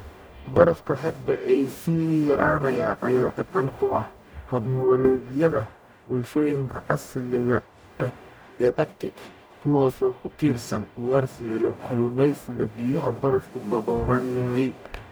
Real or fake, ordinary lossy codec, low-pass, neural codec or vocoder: fake; none; none; codec, 44.1 kHz, 0.9 kbps, DAC